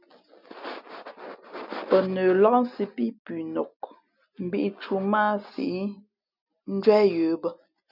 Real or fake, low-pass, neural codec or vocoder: real; 5.4 kHz; none